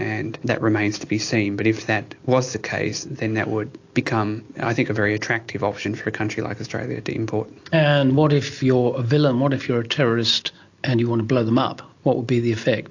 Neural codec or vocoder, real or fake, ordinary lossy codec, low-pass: none; real; AAC, 48 kbps; 7.2 kHz